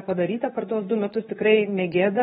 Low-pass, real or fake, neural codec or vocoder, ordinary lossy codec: 10.8 kHz; fake; codec, 24 kHz, 0.9 kbps, WavTokenizer, medium speech release version 2; AAC, 16 kbps